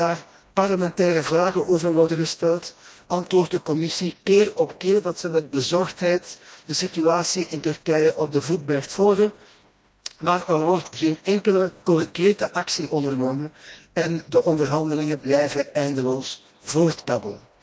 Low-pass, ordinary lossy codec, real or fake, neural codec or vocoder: none; none; fake; codec, 16 kHz, 1 kbps, FreqCodec, smaller model